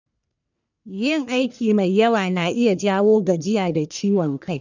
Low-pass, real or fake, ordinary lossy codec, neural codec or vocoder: 7.2 kHz; fake; none; codec, 44.1 kHz, 1.7 kbps, Pupu-Codec